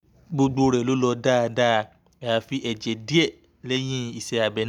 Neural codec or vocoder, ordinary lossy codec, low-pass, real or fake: none; none; 19.8 kHz; real